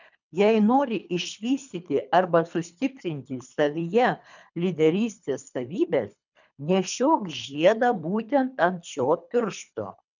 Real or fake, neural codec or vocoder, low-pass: fake; codec, 24 kHz, 3 kbps, HILCodec; 7.2 kHz